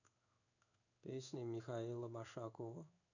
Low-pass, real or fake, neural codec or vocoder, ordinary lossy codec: 7.2 kHz; fake; codec, 16 kHz in and 24 kHz out, 1 kbps, XY-Tokenizer; none